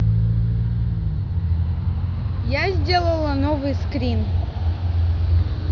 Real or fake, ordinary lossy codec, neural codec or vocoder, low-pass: real; none; none; 7.2 kHz